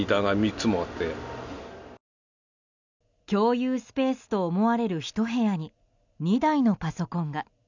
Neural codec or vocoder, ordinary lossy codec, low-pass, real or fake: none; none; 7.2 kHz; real